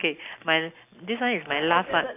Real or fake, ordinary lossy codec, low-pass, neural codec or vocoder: real; AAC, 32 kbps; 3.6 kHz; none